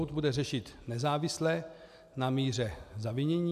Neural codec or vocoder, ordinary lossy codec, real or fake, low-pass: none; MP3, 96 kbps; real; 14.4 kHz